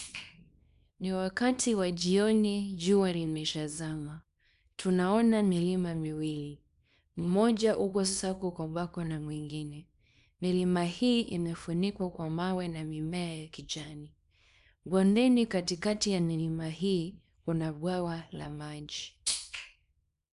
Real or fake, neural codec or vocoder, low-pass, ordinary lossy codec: fake; codec, 24 kHz, 0.9 kbps, WavTokenizer, small release; 10.8 kHz; none